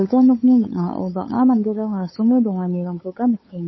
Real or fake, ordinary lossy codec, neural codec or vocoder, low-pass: fake; MP3, 24 kbps; codec, 16 kHz, 8 kbps, FunCodec, trained on LibriTTS, 25 frames a second; 7.2 kHz